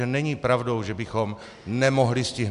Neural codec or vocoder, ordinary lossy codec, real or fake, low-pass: none; AAC, 96 kbps; real; 10.8 kHz